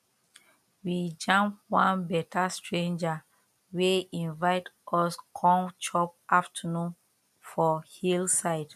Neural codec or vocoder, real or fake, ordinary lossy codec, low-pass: none; real; none; 14.4 kHz